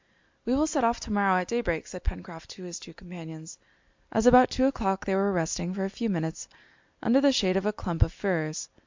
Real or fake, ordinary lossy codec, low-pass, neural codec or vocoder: real; MP3, 64 kbps; 7.2 kHz; none